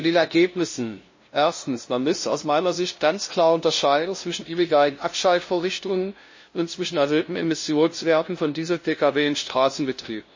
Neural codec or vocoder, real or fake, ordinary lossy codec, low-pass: codec, 16 kHz, 0.5 kbps, FunCodec, trained on LibriTTS, 25 frames a second; fake; MP3, 32 kbps; 7.2 kHz